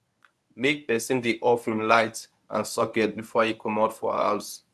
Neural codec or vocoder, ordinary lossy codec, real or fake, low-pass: codec, 24 kHz, 0.9 kbps, WavTokenizer, medium speech release version 1; none; fake; none